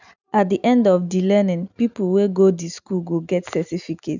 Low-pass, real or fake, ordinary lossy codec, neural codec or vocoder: 7.2 kHz; real; none; none